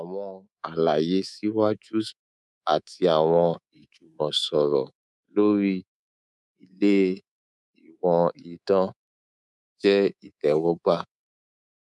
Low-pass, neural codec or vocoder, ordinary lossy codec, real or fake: none; codec, 24 kHz, 3.1 kbps, DualCodec; none; fake